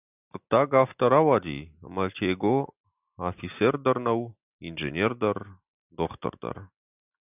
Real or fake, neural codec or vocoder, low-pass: real; none; 3.6 kHz